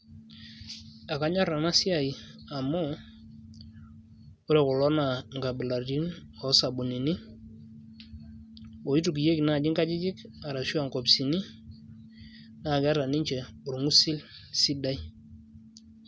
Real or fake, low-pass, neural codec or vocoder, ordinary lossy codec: real; none; none; none